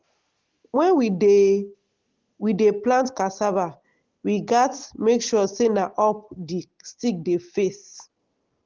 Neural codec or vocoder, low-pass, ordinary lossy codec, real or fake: none; 7.2 kHz; Opus, 16 kbps; real